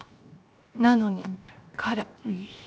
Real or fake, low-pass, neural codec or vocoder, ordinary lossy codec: fake; none; codec, 16 kHz, 0.7 kbps, FocalCodec; none